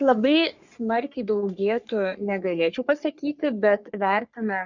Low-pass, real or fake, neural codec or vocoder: 7.2 kHz; fake; codec, 44.1 kHz, 3.4 kbps, Pupu-Codec